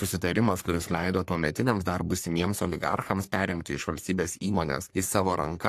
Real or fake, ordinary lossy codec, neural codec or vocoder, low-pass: fake; AAC, 64 kbps; codec, 44.1 kHz, 3.4 kbps, Pupu-Codec; 14.4 kHz